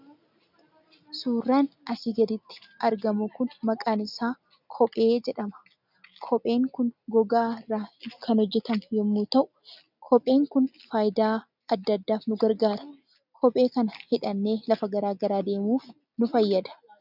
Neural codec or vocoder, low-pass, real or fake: none; 5.4 kHz; real